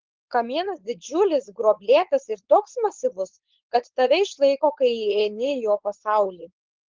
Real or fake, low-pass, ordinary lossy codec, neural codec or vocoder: fake; 7.2 kHz; Opus, 16 kbps; codec, 16 kHz, 4.8 kbps, FACodec